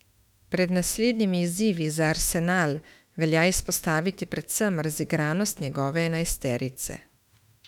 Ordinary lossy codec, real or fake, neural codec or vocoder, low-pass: none; fake; autoencoder, 48 kHz, 32 numbers a frame, DAC-VAE, trained on Japanese speech; 19.8 kHz